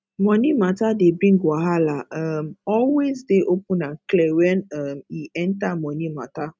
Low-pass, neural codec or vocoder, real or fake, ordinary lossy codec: none; none; real; none